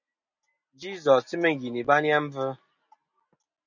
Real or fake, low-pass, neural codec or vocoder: real; 7.2 kHz; none